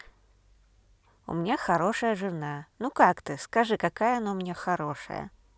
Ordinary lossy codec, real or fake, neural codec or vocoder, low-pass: none; real; none; none